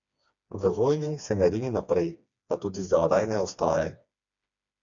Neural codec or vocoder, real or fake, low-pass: codec, 16 kHz, 2 kbps, FreqCodec, smaller model; fake; 7.2 kHz